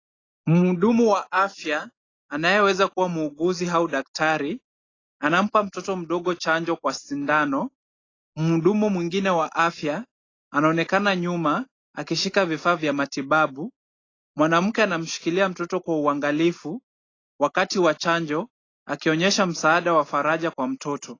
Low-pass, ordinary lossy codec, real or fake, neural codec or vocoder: 7.2 kHz; AAC, 32 kbps; real; none